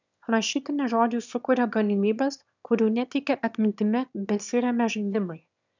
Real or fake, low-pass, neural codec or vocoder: fake; 7.2 kHz; autoencoder, 22.05 kHz, a latent of 192 numbers a frame, VITS, trained on one speaker